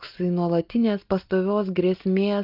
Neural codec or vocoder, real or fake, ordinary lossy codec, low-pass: none; real; Opus, 24 kbps; 5.4 kHz